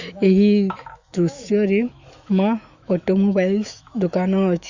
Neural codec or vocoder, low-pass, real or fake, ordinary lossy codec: autoencoder, 48 kHz, 128 numbers a frame, DAC-VAE, trained on Japanese speech; 7.2 kHz; fake; Opus, 64 kbps